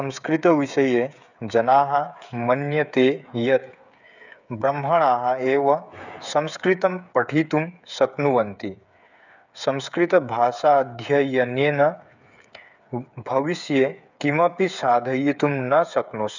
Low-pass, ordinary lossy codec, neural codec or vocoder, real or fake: 7.2 kHz; none; codec, 16 kHz, 8 kbps, FreqCodec, smaller model; fake